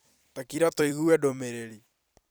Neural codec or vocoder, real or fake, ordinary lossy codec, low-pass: vocoder, 44.1 kHz, 128 mel bands every 256 samples, BigVGAN v2; fake; none; none